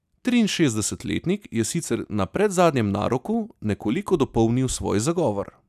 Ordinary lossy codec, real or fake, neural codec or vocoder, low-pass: none; real; none; 14.4 kHz